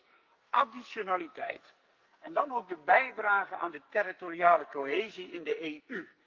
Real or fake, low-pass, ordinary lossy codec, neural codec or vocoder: fake; 7.2 kHz; Opus, 32 kbps; codec, 44.1 kHz, 2.6 kbps, SNAC